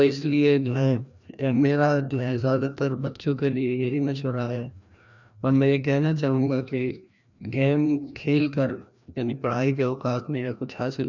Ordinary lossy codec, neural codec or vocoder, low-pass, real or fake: none; codec, 16 kHz, 1 kbps, FreqCodec, larger model; 7.2 kHz; fake